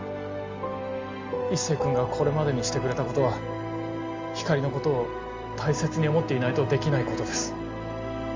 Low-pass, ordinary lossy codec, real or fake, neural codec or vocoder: 7.2 kHz; Opus, 32 kbps; real; none